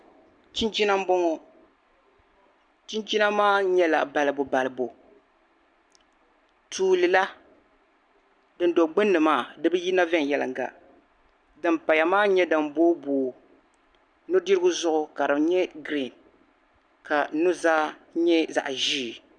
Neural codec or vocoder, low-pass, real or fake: none; 9.9 kHz; real